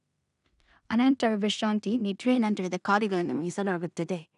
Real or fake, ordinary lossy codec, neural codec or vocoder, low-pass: fake; none; codec, 16 kHz in and 24 kHz out, 0.4 kbps, LongCat-Audio-Codec, two codebook decoder; 10.8 kHz